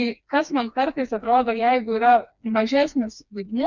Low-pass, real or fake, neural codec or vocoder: 7.2 kHz; fake; codec, 16 kHz, 2 kbps, FreqCodec, smaller model